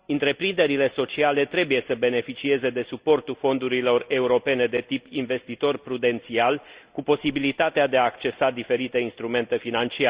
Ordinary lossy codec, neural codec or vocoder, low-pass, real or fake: Opus, 64 kbps; none; 3.6 kHz; real